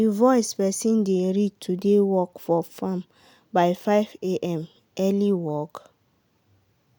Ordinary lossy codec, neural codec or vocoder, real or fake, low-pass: none; none; real; none